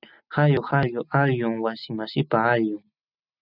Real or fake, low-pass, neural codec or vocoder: real; 5.4 kHz; none